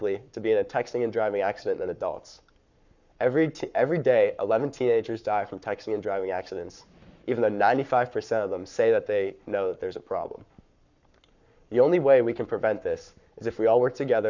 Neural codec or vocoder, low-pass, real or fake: codec, 44.1 kHz, 7.8 kbps, Pupu-Codec; 7.2 kHz; fake